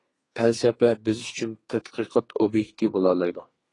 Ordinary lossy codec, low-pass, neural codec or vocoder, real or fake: AAC, 32 kbps; 10.8 kHz; codec, 32 kHz, 1.9 kbps, SNAC; fake